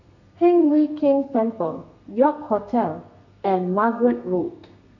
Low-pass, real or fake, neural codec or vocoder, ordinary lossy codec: 7.2 kHz; fake; codec, 32 kHz, 1.9 kbps, SNAC; none